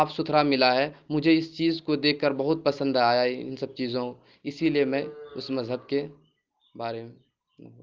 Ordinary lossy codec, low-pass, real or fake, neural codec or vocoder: Opus, 32 kbps; 7.2 kHz; real; none